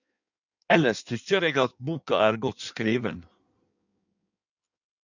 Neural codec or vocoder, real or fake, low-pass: codec, 16 kHz in and 24 kHz out, 1.1 kbps, FireRedTTS-2 codec; fake; 7.2 kHz